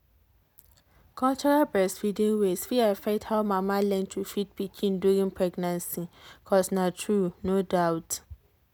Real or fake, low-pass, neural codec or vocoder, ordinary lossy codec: real; none; none; none